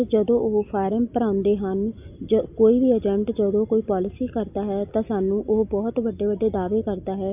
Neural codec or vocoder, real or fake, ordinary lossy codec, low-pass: none; real; AAC, 32 kbps; 3.6 kHz